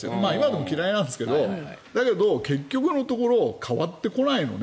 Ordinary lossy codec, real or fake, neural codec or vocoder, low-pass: none; real; none; none